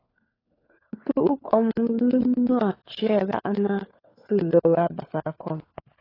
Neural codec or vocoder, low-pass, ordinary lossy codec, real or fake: codec, 16 kHz, 4 kbps, FunCodec, trained on LibriTTS, 50 frames a second; 5.4 kHz; AAC, 24 kbps; fake